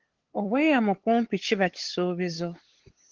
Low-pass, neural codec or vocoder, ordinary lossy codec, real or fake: 7.2 kHz; codec, 16 kHz, 8 kbps, FunCodec, trained on LibriTTS, 25 frames a second; Opus, 16 kbps; fake